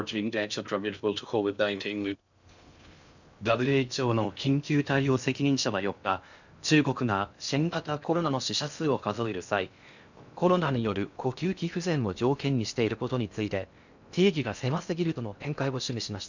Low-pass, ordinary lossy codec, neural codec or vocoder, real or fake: 7.2 kHz; none; codec, 16 kHz in and 24 kHz out, 0.6 kbps, FocalCodec, streaming, 4096 codes; fake